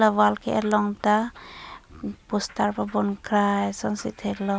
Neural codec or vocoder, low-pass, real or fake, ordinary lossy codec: none; none; real; none